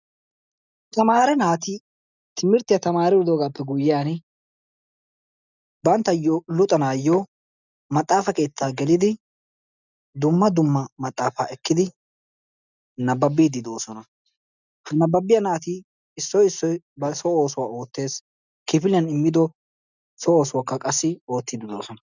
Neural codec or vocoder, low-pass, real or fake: none; 7.2 kHz; real